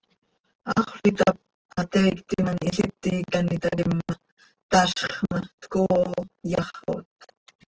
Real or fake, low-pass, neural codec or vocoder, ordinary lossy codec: real; 7.2 kHz; none; Opus, 16 kbps